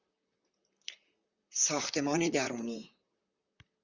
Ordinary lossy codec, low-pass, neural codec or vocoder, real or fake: Opus, 64 kbps; 7.2 kHz; vocoder, 44.1 kHz, 128 mel bands, Pupu-Vocoder; fake